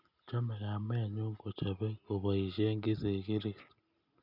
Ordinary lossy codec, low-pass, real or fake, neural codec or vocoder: none; 5.4 kHz; real; none